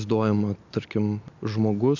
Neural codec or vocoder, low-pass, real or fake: none; 7.2 kHz; real